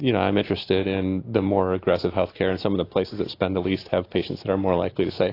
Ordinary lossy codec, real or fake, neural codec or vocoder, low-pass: AAC, 32 kbps; real; none; 5.4 kHz